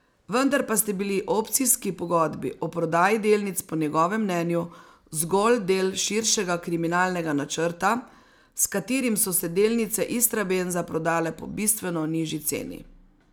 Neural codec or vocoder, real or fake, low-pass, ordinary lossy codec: none; real; none; none